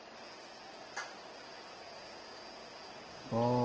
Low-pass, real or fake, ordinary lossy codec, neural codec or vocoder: 7.2 kHz; real; Opus, 16 kbps; none